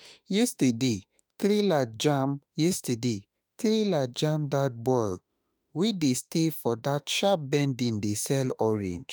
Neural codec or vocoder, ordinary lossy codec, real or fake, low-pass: autoencoder, 48 kHz, 32 numbers a frame, DAC-VAE, trained on Japanese speech; none; fake; none